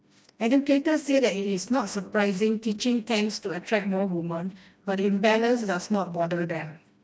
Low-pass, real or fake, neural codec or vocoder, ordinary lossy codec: none; fake; codec, 16 kHz, 1 kbps, FreqCodec, smaller model; none